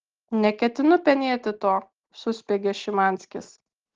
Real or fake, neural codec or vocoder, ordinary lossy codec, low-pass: real; none; Opus, 16 kbps; 7.2 kHz